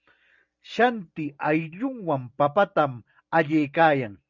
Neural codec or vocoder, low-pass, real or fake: none; 7.2 kHz; real